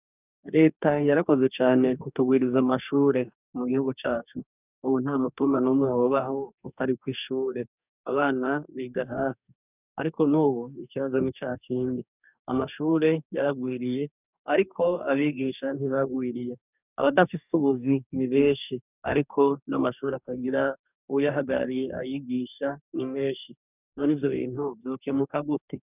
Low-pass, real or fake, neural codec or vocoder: 3.6 kHz; fake; codec, 44.1 kHz, 2.6 kbps, DAC